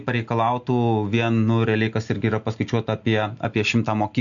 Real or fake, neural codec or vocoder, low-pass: real; none; 7.2 kHz